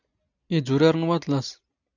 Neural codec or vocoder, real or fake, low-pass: none; real; 7.2 kHz